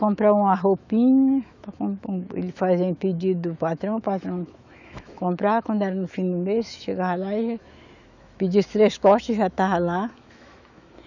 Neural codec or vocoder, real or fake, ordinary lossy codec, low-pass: autoencoder, 48 kHz, 128 numbers a frame, DAC-VAE, trained on Japanese speech; fake; none; 7.2 kHz